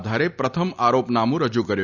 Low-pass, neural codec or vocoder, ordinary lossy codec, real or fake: 7.2 kHz; none; none; real